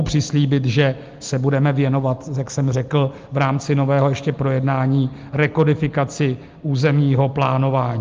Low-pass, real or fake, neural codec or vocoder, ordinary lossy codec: 7.2 kHz; real; none; Opus, 32 kbps